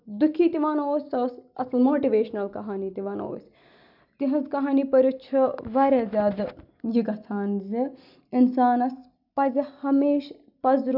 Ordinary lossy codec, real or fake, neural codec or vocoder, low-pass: none; real; none; 5.4 kHz